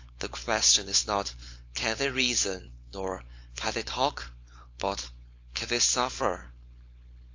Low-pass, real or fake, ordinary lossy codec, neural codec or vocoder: 7.2 kHz; fake; AAC, 48 kbps; codec, 16 kHz, 4.8 kbps, FACodec